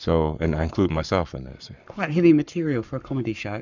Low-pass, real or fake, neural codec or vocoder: 7.2 kHz; fake; codec, 44.1 kHz, 7.8 kbps, Pupu-Codec